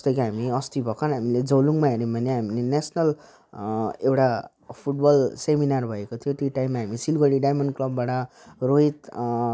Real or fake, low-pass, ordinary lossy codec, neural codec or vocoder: real; none; none; none